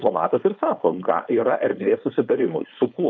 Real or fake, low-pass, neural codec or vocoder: fake; 7.2 kHz; codec, 16 kHz, 4.8 kbps, FACodec